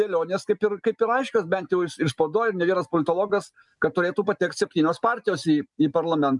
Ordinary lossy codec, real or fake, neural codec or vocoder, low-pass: MP3, 96 kbps; real; none; 10.8 kHz